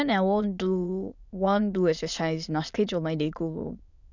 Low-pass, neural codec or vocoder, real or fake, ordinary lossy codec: 7.2 kHz; autoencoder, 22.05 kHz, a latent of 192 numbers a frame, VITS, trained on many speakers; fake; none